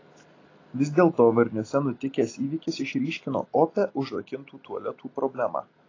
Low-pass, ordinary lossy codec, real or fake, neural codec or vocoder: 7.2 kHz; AAC, 32 kbps; real; none